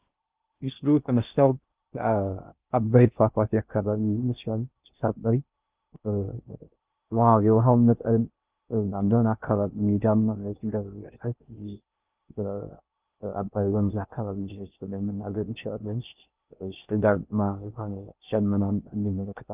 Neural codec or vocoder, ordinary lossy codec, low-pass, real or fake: codec, 16 kHz in and 24 kHz out, 0.6 kbps, FocalCodec, streaming, 2048 codes; Opus, 24 kbps; 3.6 kHz; fake